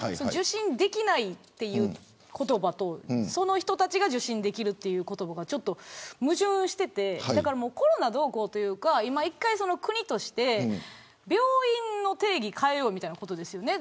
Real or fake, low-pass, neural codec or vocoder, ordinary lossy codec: real; none; none; none